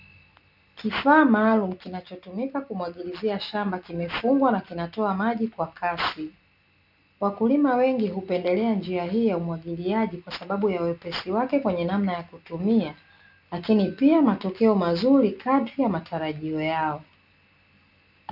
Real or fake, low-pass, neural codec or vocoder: real; 5.4 kHz; none